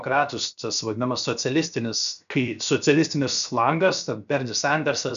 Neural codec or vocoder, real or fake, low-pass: codec, 16 kHz, 0.7 kbps, FocalCodec; fake; 7.2 kHz